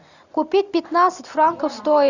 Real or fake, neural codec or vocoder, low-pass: real; none; 7.2 kHz